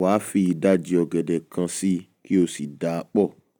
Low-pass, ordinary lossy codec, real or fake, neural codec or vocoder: none; none; real; none